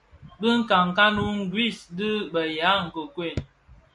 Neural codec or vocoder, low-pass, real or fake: none; 10.8 kHz; real